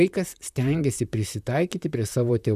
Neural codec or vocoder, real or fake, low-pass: vocoder, 44.1 kHz, 128 mel bands, Pupu-Vocoder; fake; 14.4 kHz